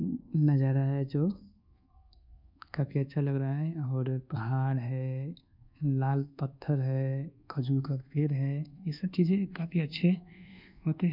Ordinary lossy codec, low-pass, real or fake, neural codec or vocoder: none; 5.4 kHz; fake; codec, 24 kHz, 1.2 kbps, DualCodec